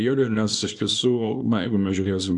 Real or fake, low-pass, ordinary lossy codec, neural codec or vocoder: fake; 10.8 kHz; AAC, 64 kbps; codec, 24 kHz, 0.9 kbps, WavTokenizer, small release